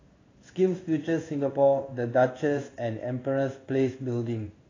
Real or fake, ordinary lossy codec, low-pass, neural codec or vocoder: fake; AAC, 32 kbps; 7.2 kHz; codec, 16 kHz in and 24 kHz out, 1 kbps, XY-Tokenizer